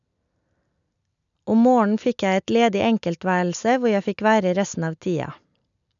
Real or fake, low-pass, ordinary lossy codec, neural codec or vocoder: real; 7.2 kHz; none; none